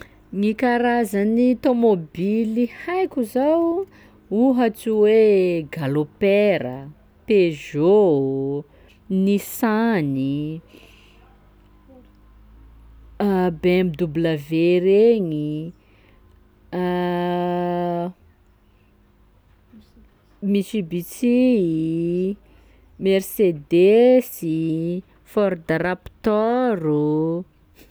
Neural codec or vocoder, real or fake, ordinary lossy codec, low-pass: none; real; none; none